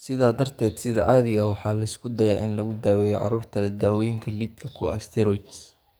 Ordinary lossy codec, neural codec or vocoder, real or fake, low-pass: none; codec, 44.1 kHz, 2.6 kbps, SNAC; fake; none